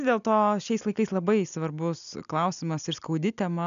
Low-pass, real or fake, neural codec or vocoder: 7.2 kHz; real; none